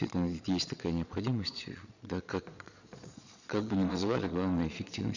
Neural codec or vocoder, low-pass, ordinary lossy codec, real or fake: vocoder, 22.05 kHz, 80 mel bands, Vocos; 7.2 kHz; none; fake